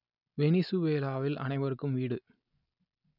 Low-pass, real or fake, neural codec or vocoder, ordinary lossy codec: 5.4 kHz; real; none; none